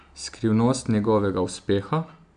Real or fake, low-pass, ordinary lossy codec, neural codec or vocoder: real; 9.9 kHz; none; none